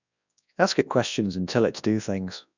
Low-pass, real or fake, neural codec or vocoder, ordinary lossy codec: 7.2 kHz; fake; codec, 24 kHz, 0.9 kbps, WavTokenizer, large speech release; none